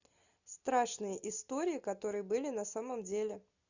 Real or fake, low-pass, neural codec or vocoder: real; 7.2 kHz; none